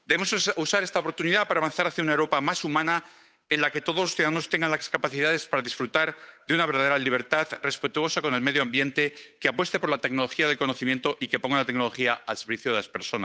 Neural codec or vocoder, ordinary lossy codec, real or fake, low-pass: codec, 16 kHz, 8 kbps, FunCodec, trained on Chinese and English, 25 frames a second; none; fake; none